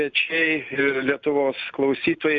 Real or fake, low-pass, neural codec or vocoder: real; 7.2 kHz; none